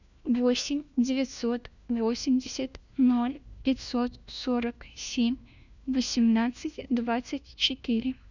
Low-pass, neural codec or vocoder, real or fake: 7.2 kHz; codec, 16 kHz, 1 kbps, FunCodec, trained on LibriTTS, 50 frames a second; fake